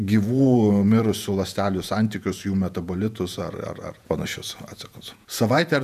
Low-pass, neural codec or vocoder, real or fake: 14.4 kHz; vocoder, 48 kHz, 128 mel bands, Vocos; fake